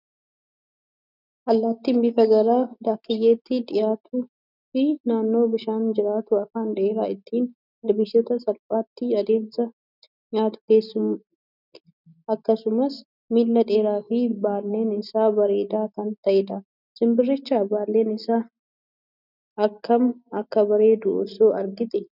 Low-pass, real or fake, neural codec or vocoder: 5.4 kHz; real; none